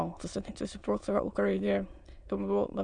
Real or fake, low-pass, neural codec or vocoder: fake; 9.9 kHz; autoencoder, 22.05 kHz, a latent of 192 numbers a frame, VITS, trained on many speakers